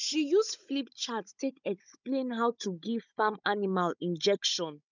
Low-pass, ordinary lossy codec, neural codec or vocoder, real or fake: 7.2 kHz; none; codec, 16 kHz, 16 kbps, FunCodec, trained on Chinese and English, 50 frames a second; fake